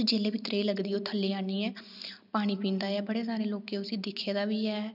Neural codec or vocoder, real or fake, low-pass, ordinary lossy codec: none; real; 5.4 kHz; none